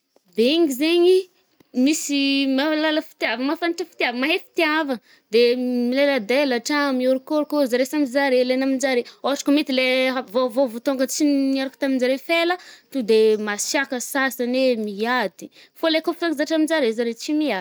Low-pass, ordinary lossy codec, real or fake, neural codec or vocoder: none; none; real; none